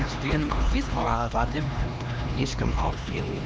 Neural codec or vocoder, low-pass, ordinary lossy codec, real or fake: codec, 16 kHz, 2 kbps, X-Codec, HuBERT features, trained on LibriSpeech; none; none; fake